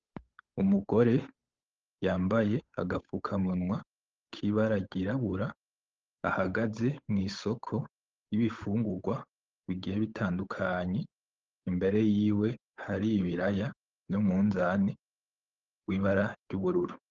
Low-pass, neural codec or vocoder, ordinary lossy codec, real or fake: 7.2 kHz; codec, 16 kHz, 8 kbps, FunCodec, trained on Chinese and English, 25 frames a second; Opus, 24 kbps; fake